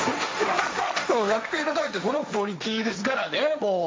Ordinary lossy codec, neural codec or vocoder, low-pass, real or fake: none; codec, 16 kHz, 1.1 kbps, Voila-Tokenizer; none; fake